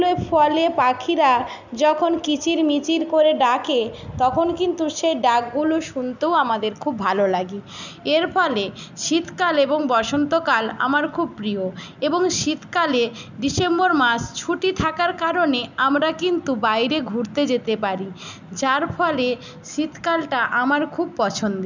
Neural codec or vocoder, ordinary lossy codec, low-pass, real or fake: none; none; 7.2 kHz; real